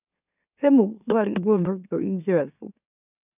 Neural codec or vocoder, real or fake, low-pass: autoencoder, 44.1 kHz, a latent of 192 numbers a frame, MeloTTS; fake; 3.6 kHz